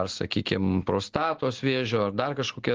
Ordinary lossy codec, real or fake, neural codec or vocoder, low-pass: Opus, 16 kbps; real; none; 7.2 kHz